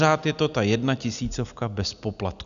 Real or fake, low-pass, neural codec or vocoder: real; 7.2 kHz; none